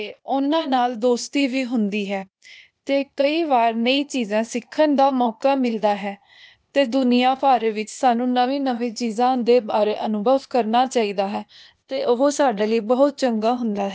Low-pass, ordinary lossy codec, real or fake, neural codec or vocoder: none; none; fake; codec, 16 kHz, 0.8 kbps, ZipCodec